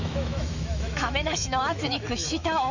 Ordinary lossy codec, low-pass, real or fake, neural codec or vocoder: none; 7.2 kHz; fake; autoencoder, 48 kHz, 128 numbers a frame, DAC-VAE, trained on Japanese speech